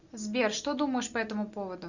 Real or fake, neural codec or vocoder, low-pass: real; none; 7.2 kHz